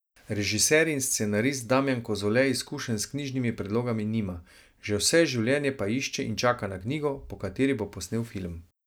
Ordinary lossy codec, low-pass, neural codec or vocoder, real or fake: none; none; none; real